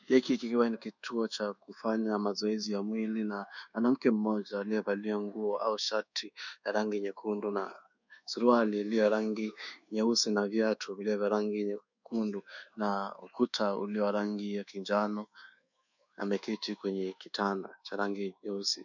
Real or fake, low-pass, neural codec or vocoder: fake; 7.2 kHz; codec, 24 kHz, 1.2 kbps, DualCodec